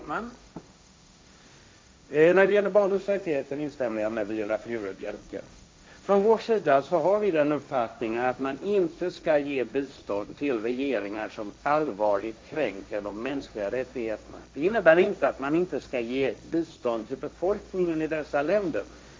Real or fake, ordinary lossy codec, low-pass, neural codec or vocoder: fake; none; none; codec, 16 kHz, 1.1 kbps, Voila-Tokenizer